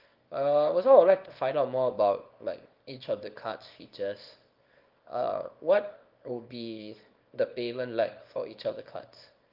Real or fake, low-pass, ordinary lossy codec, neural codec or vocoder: fake; 5.4 kHz; Opus, 24 kbps; codec, 24 kHz, 0.9 kbps, WavTokenizer, small release